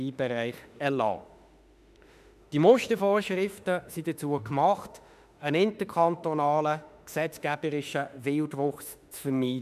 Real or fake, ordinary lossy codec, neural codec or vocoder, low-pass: fake; none; autoencoder, 48 kHz, 32 numbers a frame, DAC-VAE, trained on Japanese speech; 14.4 kHz